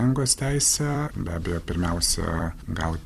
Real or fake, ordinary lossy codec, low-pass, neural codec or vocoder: fake; Opus, 64 kbps; 14.4 kHz; vocoder, 44.1 kHz, 128 mel bands every 256 samples, BigVGAN v2